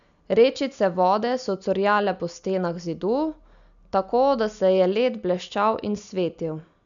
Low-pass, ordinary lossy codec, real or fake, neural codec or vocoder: 7.2 kHz; none; real; none